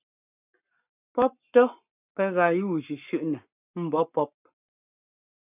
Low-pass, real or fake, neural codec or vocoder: 3.6 kHz; fake; codec, 44.1 kHz, 7.8 kbps, Pupu-Codec